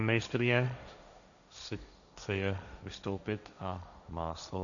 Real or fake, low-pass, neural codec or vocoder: fake; 7.2 kHz; codec, 16 kHz, 1.1 kbps, Voila-Tokenizer